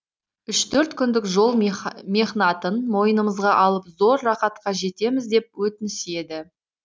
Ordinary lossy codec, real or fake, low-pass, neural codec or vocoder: none; real; none; none